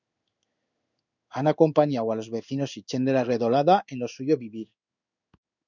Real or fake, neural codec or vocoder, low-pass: fake; codec, 16 kHz in and 24 kHz out, 1 kbps, XY-Tokenizer; 7.2 kHz